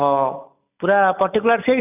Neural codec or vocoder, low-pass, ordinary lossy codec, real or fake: none; 3.6 kHz; none; real